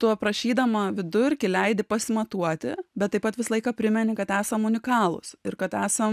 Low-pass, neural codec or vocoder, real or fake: 14.4 kHz; none; real